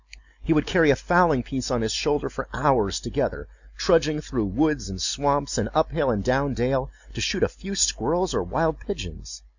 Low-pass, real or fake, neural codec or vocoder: 7.2 kHz; real; none